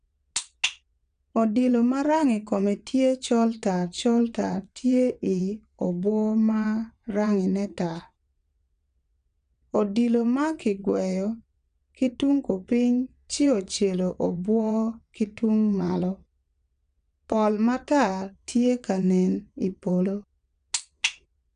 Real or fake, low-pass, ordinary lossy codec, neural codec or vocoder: fake; 9.9 kHz; none; vocoder, 22.05 kHz, 80 mel bands, WaveNeXt